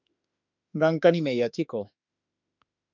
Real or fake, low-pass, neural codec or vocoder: fake; 7.2 kHz; autoencoder, 48 kHz, 32 numbers a frame, DAC-VAE, trained on Japanese speech